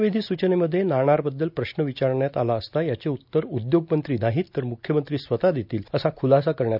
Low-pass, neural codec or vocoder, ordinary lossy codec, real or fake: 5.4 kHz; none; none; real